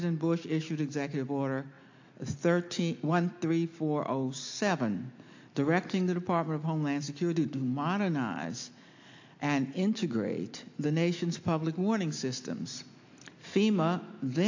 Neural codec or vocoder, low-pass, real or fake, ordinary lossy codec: none; 7.2 kHz; real; AAC, 48 kbps